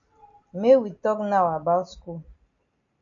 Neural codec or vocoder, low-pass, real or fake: none; 7.2 kHz; real